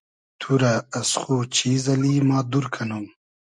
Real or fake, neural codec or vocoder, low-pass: real; none; 10.8 kHz